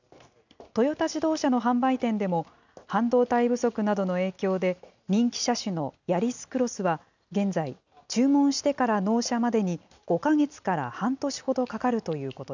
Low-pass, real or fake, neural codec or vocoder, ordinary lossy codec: 7.2 kHz; real; none; none